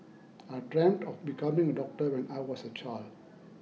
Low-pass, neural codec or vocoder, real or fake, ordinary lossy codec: none; none; real; none